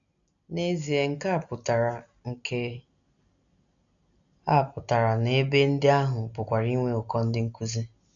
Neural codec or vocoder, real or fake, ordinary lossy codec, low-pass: none; real; none; 7.2 kHz